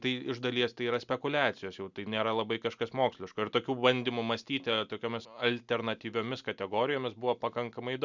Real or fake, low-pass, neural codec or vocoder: real; 7.2 kHz; none